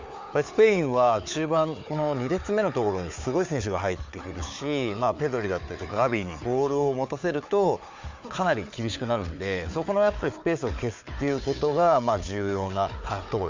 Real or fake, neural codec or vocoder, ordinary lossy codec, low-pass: fake; codec, 16 kHz, 4 kbps, FunCodec, trained on Chinese and English, 50 frames a second; MP3, 64 kbps; 7.2 kHz